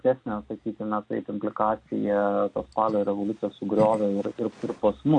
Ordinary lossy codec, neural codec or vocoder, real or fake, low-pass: AAC, 48 kbps; none; real; 9.9 kHz